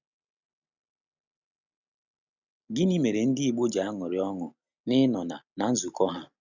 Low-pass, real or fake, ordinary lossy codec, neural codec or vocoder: 7.2 kHz; real; none; none